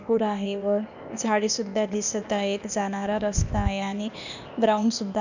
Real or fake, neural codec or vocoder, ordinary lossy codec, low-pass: fake; codec, 16 kHz, 0.8 kbps, ZipCodec; none; 7.2 kHz